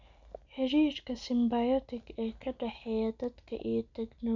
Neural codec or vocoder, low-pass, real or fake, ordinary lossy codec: none; 7.2 kHz; real; none